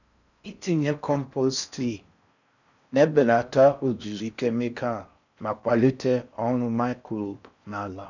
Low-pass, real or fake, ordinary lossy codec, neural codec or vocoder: 7.2 kHz; fake; none; codec, 16 kHz in and 24 kHz out, 0.6 kbps, FocalCodec, streaming, 4096 codes